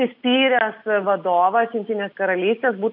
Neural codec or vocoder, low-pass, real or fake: none; 5.4 kHz; real